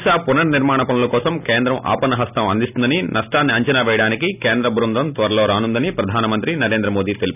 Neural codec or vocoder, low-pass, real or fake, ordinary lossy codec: none; 3.6 kHz; real; none